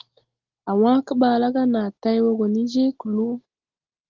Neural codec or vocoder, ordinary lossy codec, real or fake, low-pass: none; Opus, 16 kbps; real; 7.2 kHz